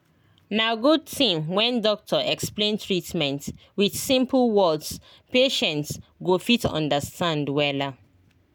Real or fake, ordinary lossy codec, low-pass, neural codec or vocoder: real; none; none; none